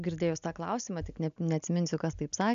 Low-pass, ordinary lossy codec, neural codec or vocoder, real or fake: 7.2 kHz; AAC, 96 kbps; none; real